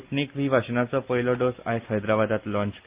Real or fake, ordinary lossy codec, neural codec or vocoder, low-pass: real; Opus, 32 kbps; none; 3.6 kHz